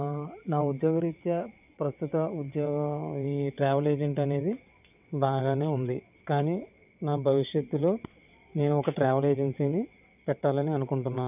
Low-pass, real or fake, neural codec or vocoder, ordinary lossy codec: 3.6 kHz; fake; vocoder, 22.05 kHz, 80 mel bands, WaveNeXt; none